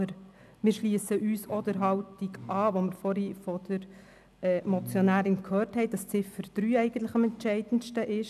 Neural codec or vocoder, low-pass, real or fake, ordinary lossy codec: none; 14.4 kHz; real; none